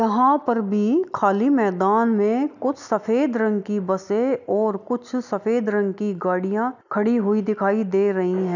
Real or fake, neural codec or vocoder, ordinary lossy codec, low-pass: real; none; none; 7.2 kHz